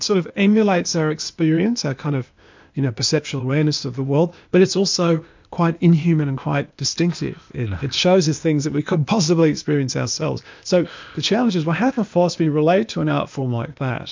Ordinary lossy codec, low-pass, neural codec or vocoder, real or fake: MP3, 64 kbps; 7.2 kHz; codec, 16 kHz, 0.8 kbps, ZipCodec; fake